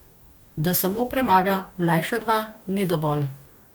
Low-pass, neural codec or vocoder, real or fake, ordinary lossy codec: none; codec, 44.1 kHz, 2.6 kbps, DAC; fake; none